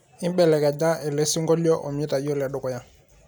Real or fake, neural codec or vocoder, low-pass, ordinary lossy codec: real; none; none; none